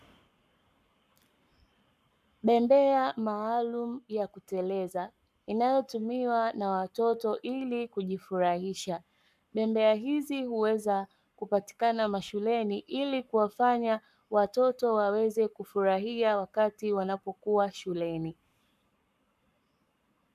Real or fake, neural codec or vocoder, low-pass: fake; codec, 44.1 kHz, 7.8 kbps, Pupu-Codec; 14.4 kHz